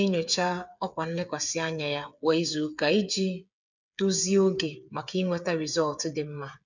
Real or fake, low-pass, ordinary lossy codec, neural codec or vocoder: fake; 7.2 kHz; none; codec, 16 kHz, 8 kbps, FreqCodec, smaller model